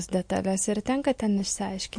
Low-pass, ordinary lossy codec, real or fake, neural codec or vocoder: 9.9 kHz; MP3, 48 kbps; real; none